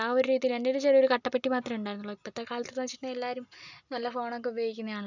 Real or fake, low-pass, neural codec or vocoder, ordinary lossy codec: real; 7.2 kHz; none; none